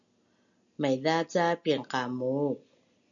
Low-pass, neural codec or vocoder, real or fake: 7.2 kHz; none; real